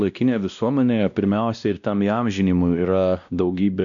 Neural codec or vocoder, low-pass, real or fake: codec, 16 kHz, 1 kbps, X-Codec, WavLM features, trained on Multilingual LibriSpeech; 7.2 kHz; fake